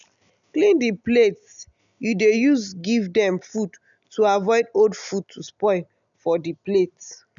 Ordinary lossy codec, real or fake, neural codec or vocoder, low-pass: none; real; none; 7.2 kHz